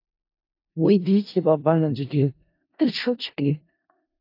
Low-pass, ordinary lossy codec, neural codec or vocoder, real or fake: 5.4 kHz; AAC, 32 kbps; codec, 16 kHz in and 24 kHz out, 0.4 kbps, LongCat-Audio-Codec, four codebook decoder; fake